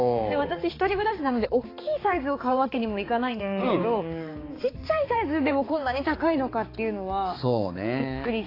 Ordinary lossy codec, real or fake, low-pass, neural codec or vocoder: AAC, 24 kbps; fake; 5.4 kHz; codec, 16 kHz, 4 kbps, X-Codec, HuBERT features, trained on balanced general audio